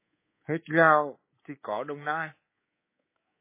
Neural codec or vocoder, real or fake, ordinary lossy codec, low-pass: codec, 24 kHz, 3.1 kbps, DualCodec; fake; MP3, 16 kbps; 3.6 kHz